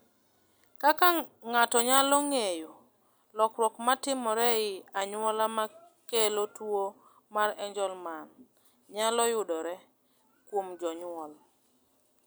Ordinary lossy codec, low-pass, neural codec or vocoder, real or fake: none; none; none; real